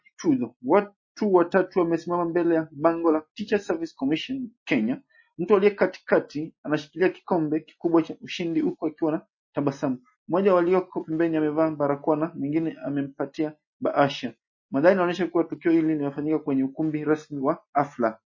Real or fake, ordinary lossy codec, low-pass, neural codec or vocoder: real; MP3, 32 kbps; 7.2 kHz; none